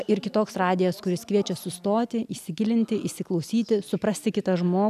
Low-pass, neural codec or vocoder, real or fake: 14.4 kHz; none; real